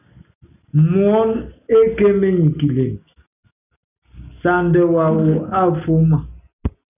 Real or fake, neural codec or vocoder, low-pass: real; none; 3.6 kHz